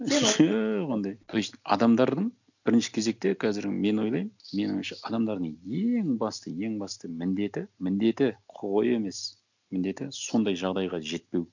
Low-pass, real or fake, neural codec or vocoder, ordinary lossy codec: 7.2 kHz; real; none; none